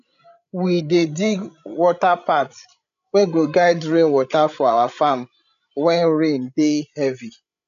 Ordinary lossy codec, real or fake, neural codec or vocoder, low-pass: none; fake; codec, 16 kHz, 16 kbps, FreqCodec, larger model; 7.2 kHz